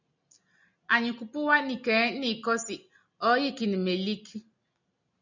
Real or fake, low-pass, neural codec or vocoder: real; 7.2 kHz; none